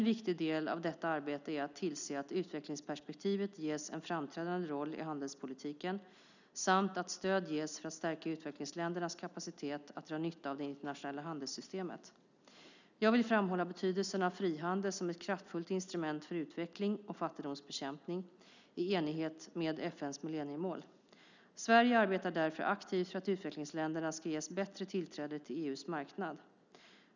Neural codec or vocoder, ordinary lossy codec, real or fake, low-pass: none; none; real; 7.2 kHz